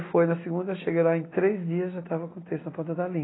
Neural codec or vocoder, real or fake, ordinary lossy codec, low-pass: none; real; AAC, 16 kbps; 7.2 kHz